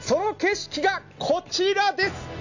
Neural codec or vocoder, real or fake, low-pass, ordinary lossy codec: none; real; 7.2 kHz; none